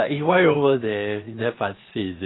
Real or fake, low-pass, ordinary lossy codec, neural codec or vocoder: fake; 7.2 kHz; AAC, 16 kbps; codec, 16 kHz, 0.7 kbps, FocalCodec